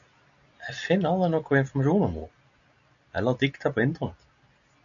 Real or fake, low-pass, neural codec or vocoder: real; 7.2 kHz; none